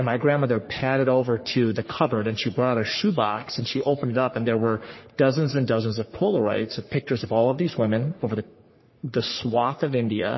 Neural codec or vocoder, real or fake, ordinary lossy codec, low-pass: codec, 44.1 kHz, 3.4 kbps, Pupu-Codec; fake; MP3, 24 kbps; 7.2 kHz